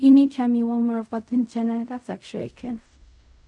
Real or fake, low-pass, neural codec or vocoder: fake; 10.8 kHz; codec, 16 kHz in and 24 kHz out, 0.4 kbps, LongCat-Audio-Codec, fine tuned four codebook decoder